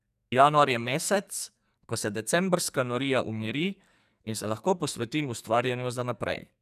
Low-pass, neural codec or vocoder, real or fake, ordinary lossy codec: 14.4 kHz; codec, 44.1 kHz, 2.6 kbps, SNAC; fake; none